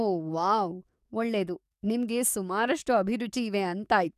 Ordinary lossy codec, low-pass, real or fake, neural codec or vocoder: none; 14.4 kHz; fake; codec, 44.1 kHz, 7.8 kbps, DAC